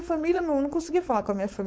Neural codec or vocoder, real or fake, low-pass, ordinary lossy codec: codec, 16 kHz, 4.8 kbps, FACodec; fake; none; none